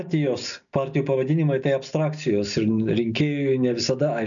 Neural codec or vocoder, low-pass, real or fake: none; 7.2 kHz; real